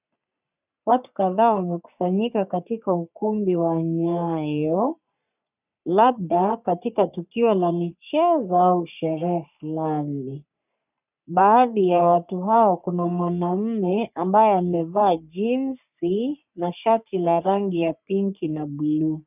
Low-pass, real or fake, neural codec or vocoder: 3.6 kHz; fake; codec, 44.1 kHz, 3.4 kbps, Pupu-Codec